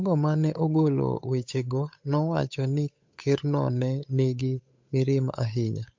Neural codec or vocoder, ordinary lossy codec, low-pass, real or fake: codec, 16 kHz, 8 kbps, FunCodec, trained on Chinese and English, 25 frames a second; MP3, 48 kbps; 7.2 kHz; fake